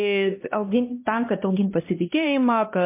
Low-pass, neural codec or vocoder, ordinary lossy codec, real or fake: 3.6 kHz; codec, 16 kHz, 1 kbps, X-Codec, HuBERT features, trained on LibriSpeech; MP3, 24 kbps; fake